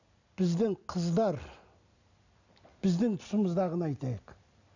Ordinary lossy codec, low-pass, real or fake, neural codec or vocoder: none; 7.2 kHz; real; none